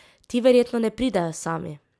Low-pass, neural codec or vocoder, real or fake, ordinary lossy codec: none; none; real; none